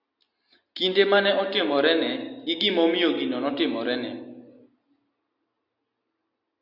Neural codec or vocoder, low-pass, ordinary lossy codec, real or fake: none; 5.4 kHz; Opus, 64 kbps; real